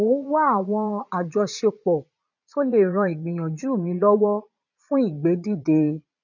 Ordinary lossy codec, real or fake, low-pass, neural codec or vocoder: none; fake; 7.2 kHz; vocoder, 22.05 kHz, 80 mel bands, Vocos